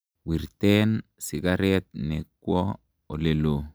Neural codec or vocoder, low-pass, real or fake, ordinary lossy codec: none; none; real; none